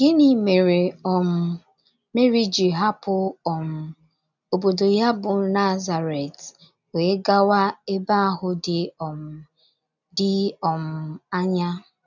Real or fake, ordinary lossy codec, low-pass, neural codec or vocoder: real; none; 7.2 kHz; none